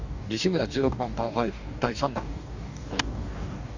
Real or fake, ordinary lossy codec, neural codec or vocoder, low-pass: fake; Opus, 64 kbps; codec, 44.1 kHz, 2.6 kbps, DAC; 7.2 kHz